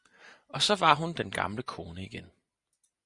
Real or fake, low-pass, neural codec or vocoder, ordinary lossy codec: real; 10.8 kHz; none; Opus, 64 kbps